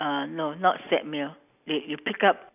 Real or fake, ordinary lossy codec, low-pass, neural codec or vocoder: real; none; 3.6 kHz; none